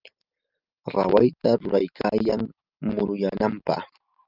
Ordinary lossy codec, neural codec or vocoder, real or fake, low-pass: Opus, 24 kbps; none; real; 5.4 kHz